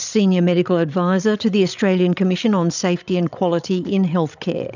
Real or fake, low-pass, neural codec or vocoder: fake; 7.2 kHz; codec, 16 kHz, 16 kbps, FreqCodec, larger model